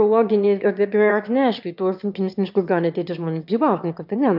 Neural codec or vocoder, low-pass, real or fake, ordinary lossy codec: autoencoder, 22.05 kHz, a latent of 192 numbers a frame, VITS, trained on one speaker; 5.4 kHz; fake; AAC, 48 kbps